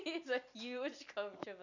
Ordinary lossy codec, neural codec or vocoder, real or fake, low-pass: none; none; real; 7.2 kHz